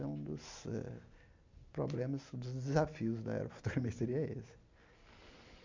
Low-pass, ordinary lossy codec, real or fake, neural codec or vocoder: 7.2 kHz; MP3, 64 kbps; real; none